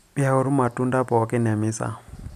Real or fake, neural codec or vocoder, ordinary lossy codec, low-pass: real; none; none; 14.4 kHz